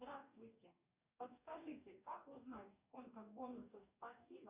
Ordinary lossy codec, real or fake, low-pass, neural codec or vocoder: Opus, 64 kbps; fake; 3.6 kHz; codec, 44.1 kHz, 2.6 kbps, DAC